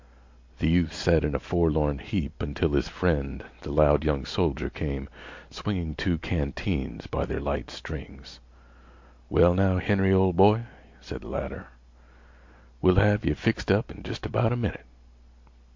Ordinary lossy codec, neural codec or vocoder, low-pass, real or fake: MP3, 48 kbps; none; 7.2 kHz; real